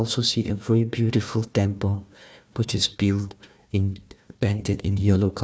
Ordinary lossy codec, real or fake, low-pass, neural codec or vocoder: none; fake; none; codec, 16 kHz, 1 kbps, FunCodec, trained on Chinese and English, 50 frames a second